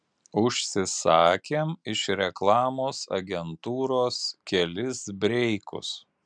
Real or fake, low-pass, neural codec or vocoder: real; 9.9 kHz; none